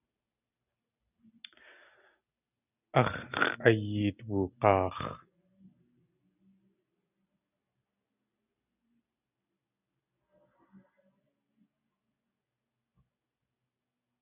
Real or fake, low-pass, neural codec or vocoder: real; 3.6 kHz; none